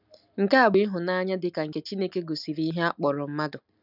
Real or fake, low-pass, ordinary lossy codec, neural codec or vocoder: real; 5.4 kHz; none; none